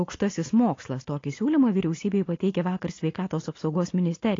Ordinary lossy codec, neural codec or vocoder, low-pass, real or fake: AAC, 32 kbps; none; 7.2 kHz; real